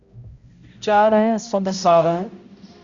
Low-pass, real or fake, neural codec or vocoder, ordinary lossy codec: 7.2 kHz; fake; codec, 16 kHz, 0.5 kbps, X-Codec, HuBERT features, trained on balanced general audio; Opus, 64 kbps